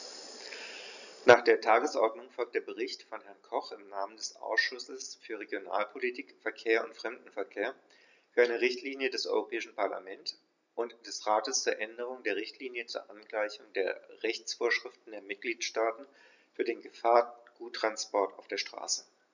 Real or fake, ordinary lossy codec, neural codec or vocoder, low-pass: real; none; none; 7.2 kHz